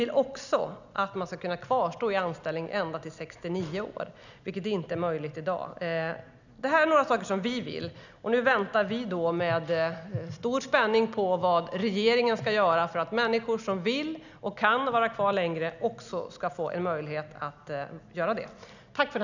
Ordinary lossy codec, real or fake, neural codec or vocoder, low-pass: none; real; none; 7.2 kHz